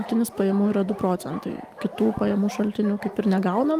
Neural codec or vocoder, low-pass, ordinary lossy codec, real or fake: none; 14.4 kHz; Opus, 32 kbps; real